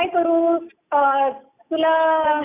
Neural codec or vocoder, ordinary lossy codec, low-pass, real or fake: none; none; 3.6 kHz; real